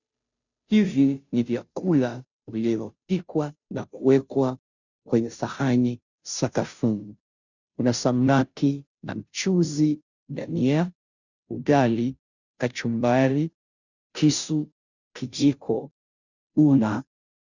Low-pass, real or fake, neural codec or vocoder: 7.2 kHz; fake; codec, 16 kHz, 0.5 kbps, FunCodec, trained on Chinese and English, 25 frames a second